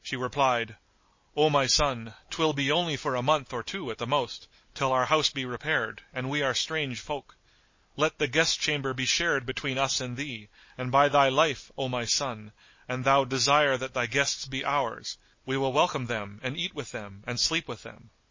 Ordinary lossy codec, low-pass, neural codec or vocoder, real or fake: MP3, 32 kbps; 7.2 kHz; none; real